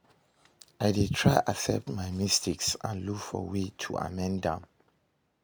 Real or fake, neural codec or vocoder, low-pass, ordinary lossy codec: real; none; none; none